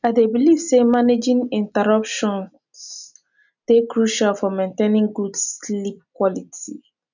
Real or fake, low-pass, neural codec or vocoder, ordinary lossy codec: real; 7.2 kHz; none; none